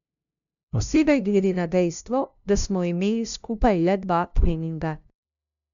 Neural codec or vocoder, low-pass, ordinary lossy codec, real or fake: codec, 16 kHz, 0.5 kbps, FunCodec, trained on LibriTTS, 25 frames a second; 7.2 kHz; none; fake